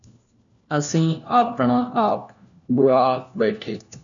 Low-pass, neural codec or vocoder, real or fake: 7.2 kHz; codec, 16 kHz, 1 kbps, FunCodec, trained on LibriTTS, 50 frames a second; fake